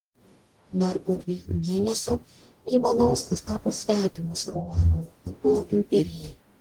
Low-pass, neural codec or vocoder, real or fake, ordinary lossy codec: 19.8 kHz; codec, 44.1 kHz, 0.9 kbps, DAC; fake; Opus, 24 kbps